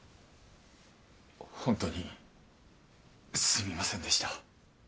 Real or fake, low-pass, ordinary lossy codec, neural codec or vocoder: real; none; none; none